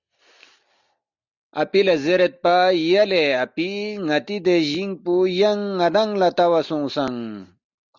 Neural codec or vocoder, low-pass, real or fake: none; 7.2 kHz; real